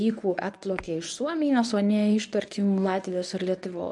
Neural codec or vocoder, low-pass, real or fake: codec, 24 kHz, 0.9 kbps, WavTokenizer, medium speech release version 1; 10.8 kHz; fake